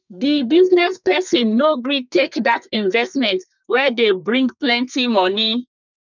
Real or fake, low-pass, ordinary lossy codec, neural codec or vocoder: fake; 7.2 kHz; none; codec, 32 kHz, 1.9 kbps, SNAC